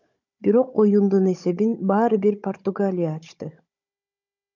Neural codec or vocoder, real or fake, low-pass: codec, 16 kHz, 16 kbps, FunCodec, trained on Chinese and English, 50 frames a second; fake; 7.2 kHz